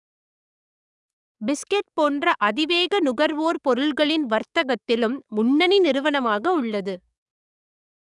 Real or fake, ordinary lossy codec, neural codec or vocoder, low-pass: fake; none; codec, 44.1 kHz, 7.8 kbps, DAC; 10.8 kHz